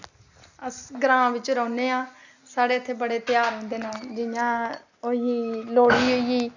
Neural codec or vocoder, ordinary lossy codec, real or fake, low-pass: none; none; real; 7.2 kHz